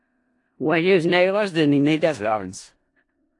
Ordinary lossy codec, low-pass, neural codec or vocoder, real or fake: AAC, 48 kbps; 10.8 kHz; codec, 16 kHz in and 24 kHz out, 0.4 kbps, LongCat-Audio-Codec, four codebook decoder; fake